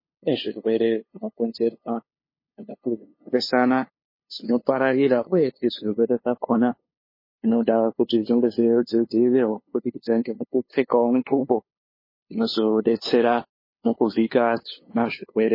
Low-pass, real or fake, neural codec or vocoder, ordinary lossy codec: 5.4 kHz; fake; codec, 16 kHz, 2 kbps, FunCodec, trained on LibriTTS, 25 frames a second; MP3, 24 kbps